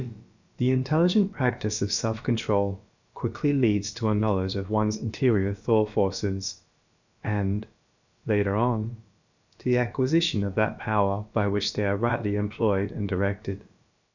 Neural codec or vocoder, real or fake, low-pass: codec, 16 kHz, about 1 kbps, DyCAST, with the encoder's durations; fake; 7.2 kHz